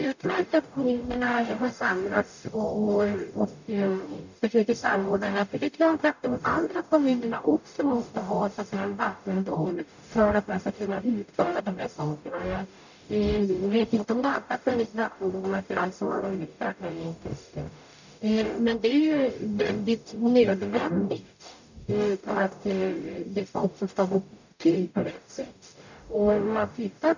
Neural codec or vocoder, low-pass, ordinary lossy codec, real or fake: codec, 44.1 kHz, 0.9 kbps, DAC; 7.2 kHz; none; fake